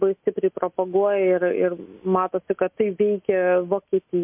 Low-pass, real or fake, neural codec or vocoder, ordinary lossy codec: 3.6 kHz; real; none; MP3, 32 kbps